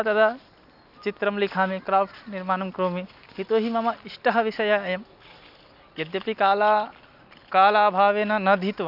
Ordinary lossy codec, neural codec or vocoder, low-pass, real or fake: none; none; 5.4 kHz; real